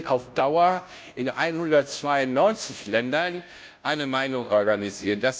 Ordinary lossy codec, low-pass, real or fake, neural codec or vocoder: none; none; fake; codec, 16 kHz, 0.5 kbps, FunCodec, trained on Chinese and English, 25 frames a second